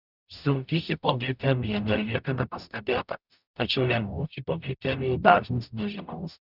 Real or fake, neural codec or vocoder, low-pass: fake; codec, 44.1 kHz, 0.9 kbps, DAC; 5.4 kHz